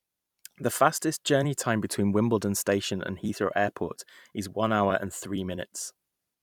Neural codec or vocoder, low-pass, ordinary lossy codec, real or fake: vocoder, 44.1 kHz, 128 mel bands every 256 samples, BigVGAN v2; 19.8 kHz; none; fake